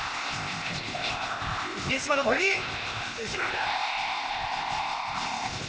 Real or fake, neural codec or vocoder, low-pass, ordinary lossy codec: fake; codec, 16 kHz, 0.8 kbps, ZipCodec; none; none